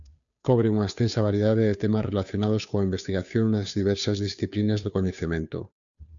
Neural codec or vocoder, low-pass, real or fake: codec, 16 kHz, 2 kbps, FunCodec, trained on Chinese and English, 25 frames a second; 7.2 kHz; fake